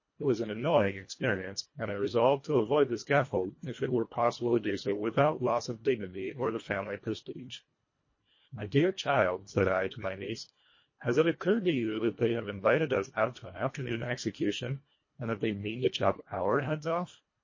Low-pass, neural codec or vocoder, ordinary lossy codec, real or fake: 7.2 kHz; codec, 24 kHz, 1.5 kbps, HILCodec; MP3, 32 kbps; fake